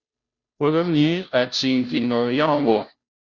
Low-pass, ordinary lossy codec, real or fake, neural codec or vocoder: 7.2 kHz; Opus, 64 kbps; fake; codec, 16 kHz, 0.5 kbps, FunCodec, trained on Chinese and English, 25 frames a second